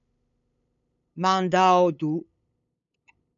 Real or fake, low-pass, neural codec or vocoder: fake; 7.2 kHz; codec, 16 kHz, 8 kbps, FunCodec, trained on LibriTTS, 25 frames a second